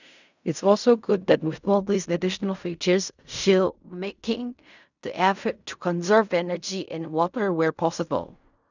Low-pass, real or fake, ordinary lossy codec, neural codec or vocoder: 7.2 kHz; fake; none; codec, 16 kHz in and 24 kHz out, 0.4 kbps, LongCat-Audio-Codec, fine tuned four codebook decoder